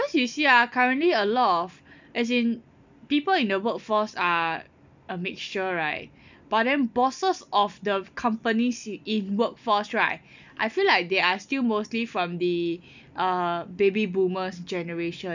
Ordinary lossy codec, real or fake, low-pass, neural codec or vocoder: none; real; 7.2 kHz; none